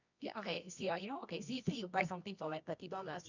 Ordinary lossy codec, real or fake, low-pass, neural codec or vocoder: none; fake; 7.2 kHz; codec, 24 kHz, 0.9 kbps, WavTokenizer, medium music audio release